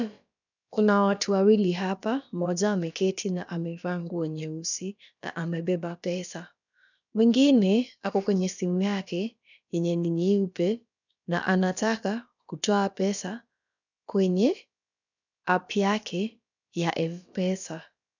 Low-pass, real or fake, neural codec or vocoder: 7.2 kHz; fake; codec, 16 kHz, about 1 kbps, DyCAST, with the encoder's durations